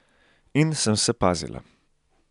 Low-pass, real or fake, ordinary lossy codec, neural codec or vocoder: 10.8 kHz; real; none; none